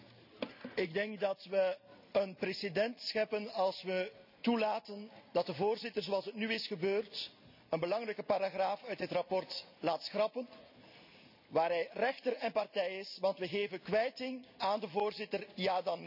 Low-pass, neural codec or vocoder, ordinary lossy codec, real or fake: 5.4 kHz; none; none; real